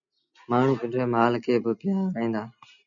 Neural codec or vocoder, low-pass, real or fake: none; 7.2 kHz; real